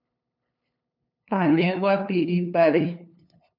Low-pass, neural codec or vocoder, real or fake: 5.4 kHz; codec, 16 kHz, 2 kbps, FunCodec, trained on LibriTTS, 25 frames a second; fake